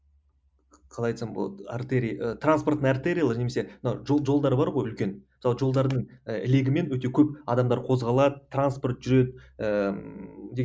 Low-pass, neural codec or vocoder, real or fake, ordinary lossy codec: none; none; real; none